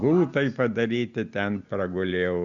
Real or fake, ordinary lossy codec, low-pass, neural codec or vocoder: real; Opus, 64 kbps; 7.2 kHz; none